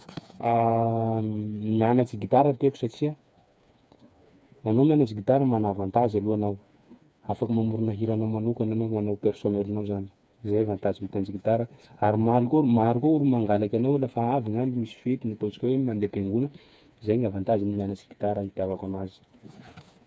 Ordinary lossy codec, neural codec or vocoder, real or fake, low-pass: none; codec, 16 kHz, 4 kbps, FreqCodec, smaller model; fake; none